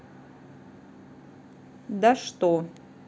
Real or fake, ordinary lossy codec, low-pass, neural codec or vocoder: real; none; none; none